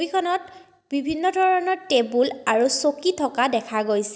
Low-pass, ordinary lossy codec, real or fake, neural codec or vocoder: none; none; real; none